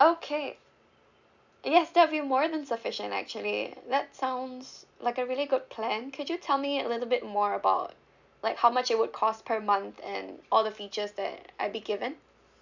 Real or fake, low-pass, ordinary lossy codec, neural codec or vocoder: real; 7.2 kHz; none; none